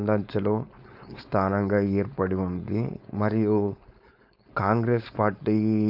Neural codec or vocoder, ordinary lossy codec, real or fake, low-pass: codec, 16 kHz, 4.8 kbps, FACodec; AAC, 48 kbps; fake; 5.4 kHz